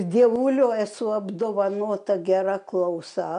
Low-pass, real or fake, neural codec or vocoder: 9.9 kHz; real; none